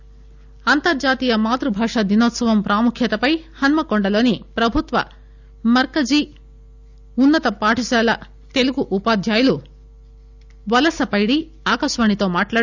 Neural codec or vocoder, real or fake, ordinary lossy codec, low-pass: none; real; none; 7.2 kHz